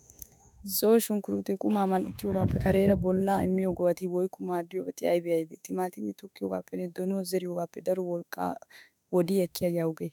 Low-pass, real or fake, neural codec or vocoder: 19.8 kHz; fake; autoencoder, 48 kHz, 32 numbers a frame, DAC-VAE, trained on Japanese speech